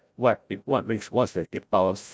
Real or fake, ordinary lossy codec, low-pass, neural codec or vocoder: fake; none; none; codec, 16 kHz, 0.5 kbps, FreqCodec, larger model